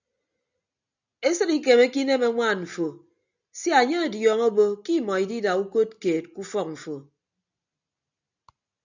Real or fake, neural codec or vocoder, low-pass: real; none; 7.2 kHz